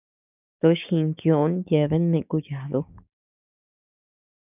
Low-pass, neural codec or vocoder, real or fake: 3.6 kHz; codec, 16 kHz, 6 kbps, DAC; fake